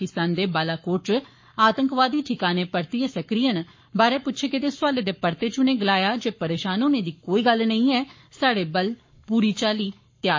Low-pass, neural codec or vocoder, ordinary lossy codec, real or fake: 7.2 kHz; codec, 44.1 kHz, 7.8 kbps, Pupu-Codec; MP3, 32 kbps; fake